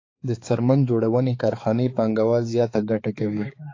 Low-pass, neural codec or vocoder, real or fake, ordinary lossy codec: 7.2 kHz; codec, 16 kHz, 4 kbps, X-Codec, HuBERT features, trained on balanced general audio; fake; AAC, 32 kbps